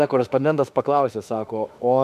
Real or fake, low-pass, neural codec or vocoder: fake; 14.4 kHz; autoencoder, 48 kHz, 32 numbers a frame, DAC-VAE, trained on Japanese speech